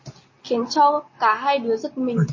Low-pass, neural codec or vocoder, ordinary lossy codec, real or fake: 7.2 kHz; none; MP3, 32 kbps; real